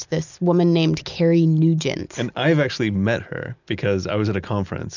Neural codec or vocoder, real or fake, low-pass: none; real; 7.2 kHz